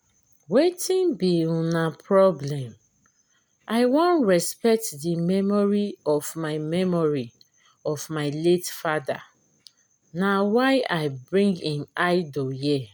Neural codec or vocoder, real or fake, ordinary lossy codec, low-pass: none; real; none; none